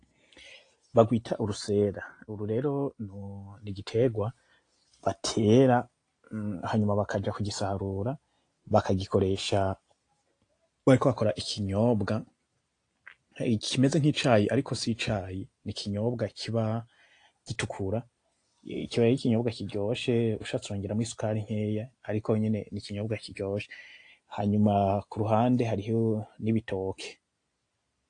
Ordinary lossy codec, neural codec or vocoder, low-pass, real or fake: AAC, 48 kbps; none; 9.9 kHz; real